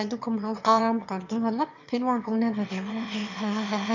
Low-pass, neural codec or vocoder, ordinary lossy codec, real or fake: 7.2 kHz; autoencoder, 22.05 kHz, a latent of 192 numbers a frame, VITS, trained on one speaker; none; fake